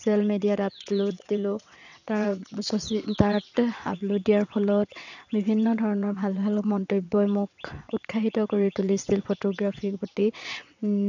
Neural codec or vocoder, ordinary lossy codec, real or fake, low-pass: vocoder, 22.05 kHz, 80 mel bands, WaveNeXt; none; fake; 7.2 kHz